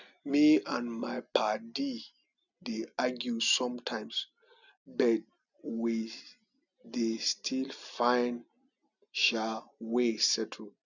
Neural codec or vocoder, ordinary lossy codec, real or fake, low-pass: none; none; real; 7.2 kHz